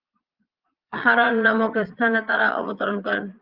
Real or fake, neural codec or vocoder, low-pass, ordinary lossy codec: fake; vocoder, 22.05 kHz, 80 mel bands, Vocos; 5.4 kHz; Opus, 32 kbps